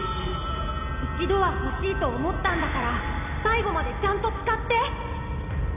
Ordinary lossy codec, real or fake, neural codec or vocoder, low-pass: none; real; none; 3.6 kHz